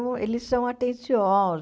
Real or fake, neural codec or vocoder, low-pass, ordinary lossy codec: real; none; none; none